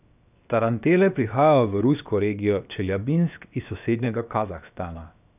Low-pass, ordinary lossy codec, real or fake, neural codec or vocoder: 3.6 kHz; none; fake; codec, 16 kHz, 0.7 kbps, FocalCodec